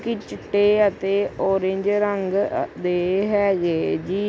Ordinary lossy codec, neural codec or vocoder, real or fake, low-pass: none; none; real; none